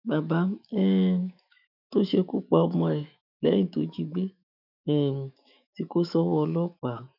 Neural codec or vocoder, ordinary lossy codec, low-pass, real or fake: autoencoder, 48 kHz, 128 numbers a frame, DAC-VAE, trained on Japanese speech; none; 5.4 kHz; fake